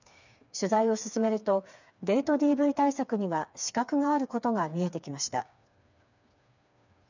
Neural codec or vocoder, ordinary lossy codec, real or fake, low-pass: codec, 16 kHz, 4 kbps, FreqCodec, smaller model; none; fake; 7.2 kHz